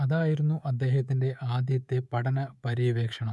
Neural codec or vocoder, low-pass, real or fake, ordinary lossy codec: codec, 24 kHz, 3.1 kbps, DualCodec; none; fake; none